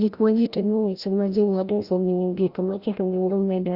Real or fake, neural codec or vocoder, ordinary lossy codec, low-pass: fake; codec, 16 kHz, 0.5 kbps, FreqCodec, larger model; Opus, 64 kbps; 5.4 kHz